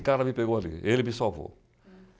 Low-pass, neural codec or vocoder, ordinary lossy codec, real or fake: none; none; none; real